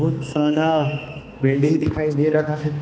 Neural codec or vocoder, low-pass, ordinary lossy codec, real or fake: codec, 16 kHz, 2 kbps, X-Codec, HuBERT features, trained on balanced general audio; none; none; fake